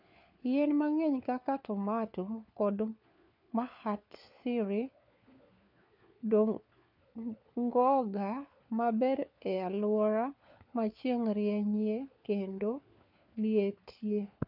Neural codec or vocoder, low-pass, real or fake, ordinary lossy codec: codec, 16 kHz, 4 kbps, FunCodec, trained on LibriTTS, 50 frames a second; 5.4 kHz; fake; none